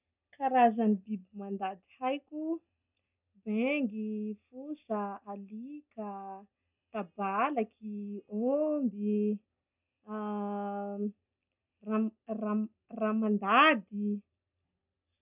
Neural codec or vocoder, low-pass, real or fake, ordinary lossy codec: none; 3.6 kHz; real; none